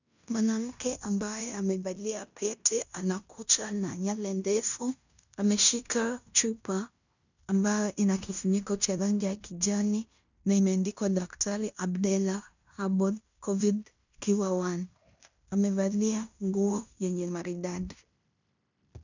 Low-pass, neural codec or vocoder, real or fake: 7.2 kHz; codec, 16 kHz in and 24 kHz out, 0.9 kbps, LongCat-Audio-Codec, fine tuned four codebook decoder; fake